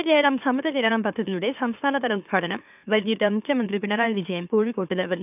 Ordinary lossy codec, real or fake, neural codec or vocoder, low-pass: none; fake; autoencoder, 44.1 kHz, a latent of 192 numbers a frame, MeloTTS; 3.6 kHz